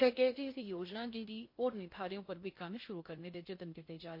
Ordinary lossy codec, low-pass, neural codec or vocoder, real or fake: MP3, 32 kbps; 5.4 kHz; codec, 16 kHz in and 24 kHz out, 0.6 kbps, FocalCodec, streaming, 2048 codes; fake